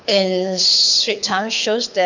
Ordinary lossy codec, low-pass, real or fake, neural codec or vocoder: none; 7.2 kHz; fake; codec, 24 kHz, 6 kbps, HILCodec